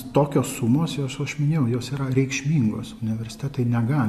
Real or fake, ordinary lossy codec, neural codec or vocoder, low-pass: real; MP3, 64 kbps; none; 14.4 kHz